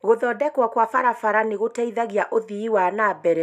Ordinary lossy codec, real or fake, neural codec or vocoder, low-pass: none; real; none; 14.4 kHz